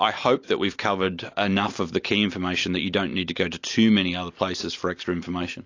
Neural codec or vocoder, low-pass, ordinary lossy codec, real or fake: none; 7.2 kHz; AAC, 48 kbps; real